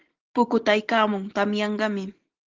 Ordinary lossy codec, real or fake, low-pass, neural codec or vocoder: Opus, 16 kbps; real; 7.2 kHz; none